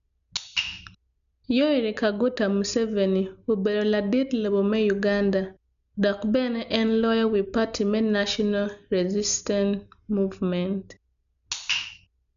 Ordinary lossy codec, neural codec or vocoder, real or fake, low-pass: none; none; real; 7.2 kHz